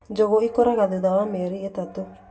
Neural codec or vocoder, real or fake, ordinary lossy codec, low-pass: none; real; none; none